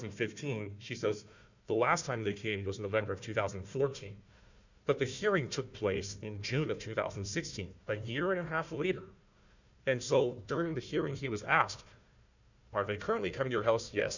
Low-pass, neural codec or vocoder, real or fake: 7.2 kHz; codec, 16 kHz, 1 kbps, FunCodec, trained on Chinese and English, 50 frames a second; fake